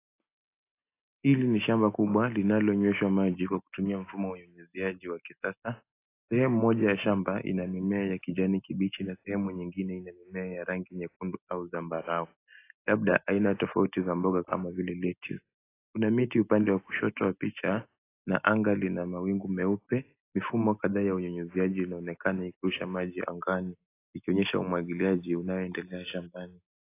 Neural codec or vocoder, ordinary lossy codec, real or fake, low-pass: none; AAC, 24 kbps; real; 3.6 kHz